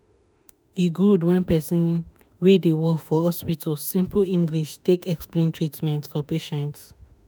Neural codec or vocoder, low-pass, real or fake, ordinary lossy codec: autoencoder, 48 kHz, 32 numbers a frame, DAC-VAE, trained on Japanese speech; none; fake; none